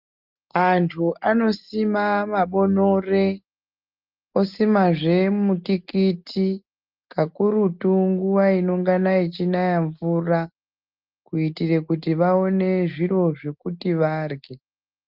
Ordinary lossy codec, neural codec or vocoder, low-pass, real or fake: Opus, 32 kbps; none; 5.4 kHz; real